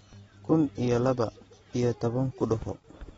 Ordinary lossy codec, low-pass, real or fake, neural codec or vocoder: AAC, 24 kbps; 19.8 kHz; real; none